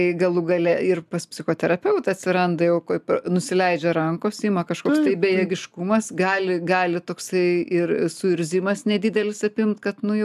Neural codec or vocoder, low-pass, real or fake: none; 14.4 kHz; real